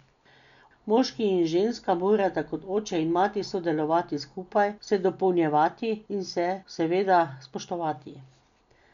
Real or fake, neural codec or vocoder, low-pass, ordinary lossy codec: real; none; 7.2 kHz; none